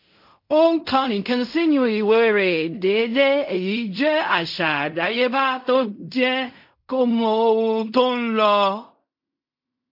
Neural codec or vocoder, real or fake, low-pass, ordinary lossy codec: codec, 16 kHz in and 24 kHz out, 0.4 kbps, LongCat-Audio-Codec, fine tuned four codebook decoder; fake; 5.4 kHz; MP3, 32 kbps